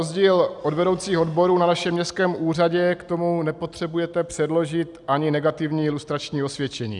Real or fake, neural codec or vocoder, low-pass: real; none; 10.8 kHz